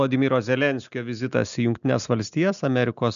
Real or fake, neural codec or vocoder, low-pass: real; none; 7.2 kHz